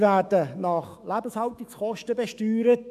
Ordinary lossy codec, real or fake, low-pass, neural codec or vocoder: MP3, 96 kbps; fake; 14.4 kHz; autoencoder, 48 kHz, 128 numbers a frame, DAC-VAE, trained on Japanese speech